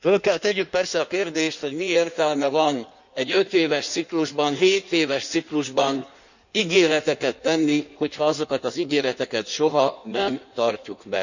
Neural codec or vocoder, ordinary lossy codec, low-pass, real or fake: codec, 16 kHz in and 24 kHz out, 1.1 kbps, FireRedTTS-2 codec; none; 7.2 kHz; fake